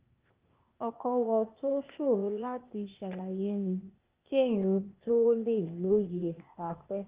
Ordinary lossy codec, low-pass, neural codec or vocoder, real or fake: Opus, 24 kbps; 3.6 kHz; codec, 16 kHz, 0.8 kbps, ZipCodec; fake